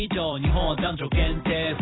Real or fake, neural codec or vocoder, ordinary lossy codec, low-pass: fake; vocoder, 44.1 kHz, 128 mel bands every 256 samples, BigVGAN v2; AAC, 16 kbps; 7.2 kHz